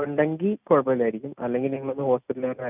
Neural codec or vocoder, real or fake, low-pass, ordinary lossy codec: none; real; 3.6 kHz; none